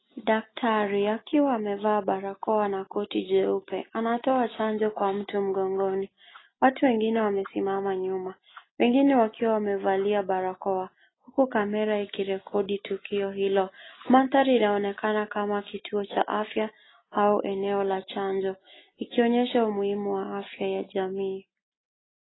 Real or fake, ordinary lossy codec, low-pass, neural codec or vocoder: real; AAC, 16 kbps; 7.2 kHz; none